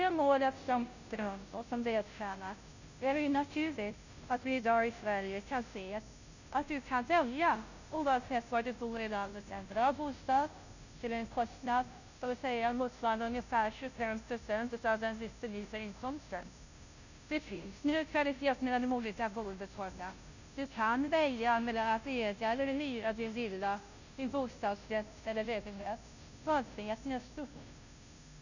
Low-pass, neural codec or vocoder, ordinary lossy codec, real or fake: 7.2 kHz; codec, 16 kHz, 0.5 kbps, FunCodec, trained on Chinese and English, 25 frames a second; none; fake